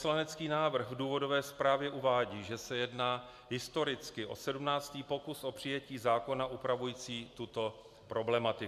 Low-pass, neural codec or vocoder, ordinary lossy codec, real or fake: 14.4 kHz; none; AAC, 96 kbps; real